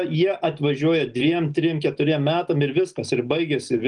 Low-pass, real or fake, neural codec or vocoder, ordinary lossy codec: 9.9 kHz; real; none; Opus, 24 kbps